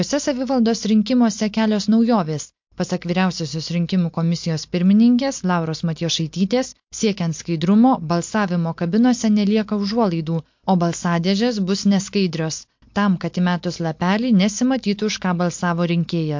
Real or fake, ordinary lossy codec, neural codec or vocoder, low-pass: real; MP3, 48 kbps; none; 7.2 kHz